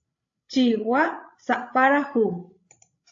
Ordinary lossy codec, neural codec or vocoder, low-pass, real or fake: AAC, 48 kbps; codec, 16 kHz, 16 kbps, FreqCodec, larger model; 7.2 kHz; fake